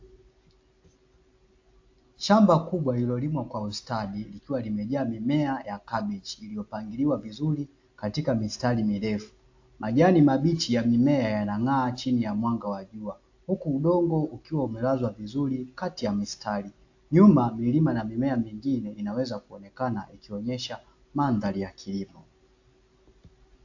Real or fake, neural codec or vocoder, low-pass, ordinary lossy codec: real; none; 7.2 kHz; AAC, 48 kbps